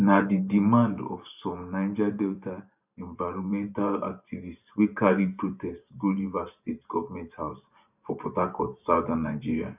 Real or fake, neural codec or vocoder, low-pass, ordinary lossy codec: fake; vocoder, 44.1 kHz, 128 mel bands every 512 samples, BigVGAN v2; 3.6 kHz; none